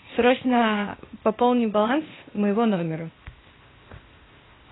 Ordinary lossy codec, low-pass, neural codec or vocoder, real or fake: AAC, 16 kbps; 7.2 kHz; codec, 16 kHz, 0.8 kbps, ZipCodec; fake